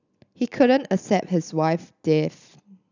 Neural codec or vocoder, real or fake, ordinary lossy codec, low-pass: none; real; none; 7.2 kHz